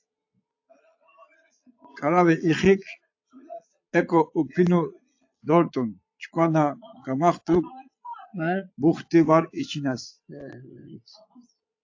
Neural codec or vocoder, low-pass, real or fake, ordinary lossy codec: vocoder, 22.05 kHz, 80 mel bands, Vocos; 7.2 kHz; fake; AAC, 48 kbps